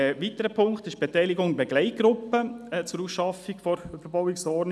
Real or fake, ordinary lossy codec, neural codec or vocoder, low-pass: real; none; none; none